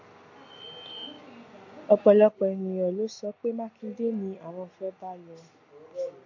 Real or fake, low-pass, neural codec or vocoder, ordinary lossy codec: real; 7.2 kHz; none; AAC, 48 kbps